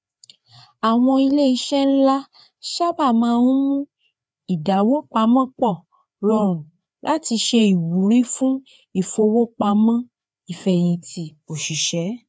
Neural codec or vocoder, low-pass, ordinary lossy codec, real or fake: codec, 16 kHz, 4 kbps, FreqCodec, larger model; none; none; fake